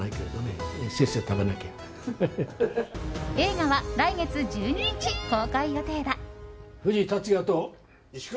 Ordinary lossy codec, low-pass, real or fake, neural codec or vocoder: none; none; real; none